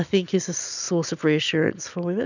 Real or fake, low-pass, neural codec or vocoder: real; 7.2 kHz; none